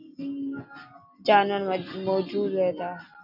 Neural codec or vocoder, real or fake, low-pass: none; real; 5.4 kHz